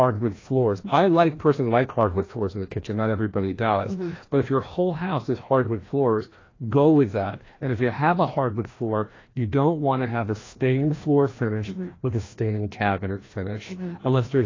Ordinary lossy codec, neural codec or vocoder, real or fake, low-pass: AAC, 32 kbps; codec, 16 kHz, 1 kbps, FreqCodec, larger model; fake; 7.2 kHz